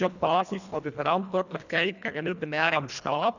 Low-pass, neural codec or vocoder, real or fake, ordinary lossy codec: 7.2 kHz; codec, 24 kHz, 1.5 kbps, HILCodec; fake; none